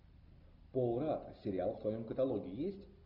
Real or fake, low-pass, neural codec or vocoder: real; 5.4 kHz; none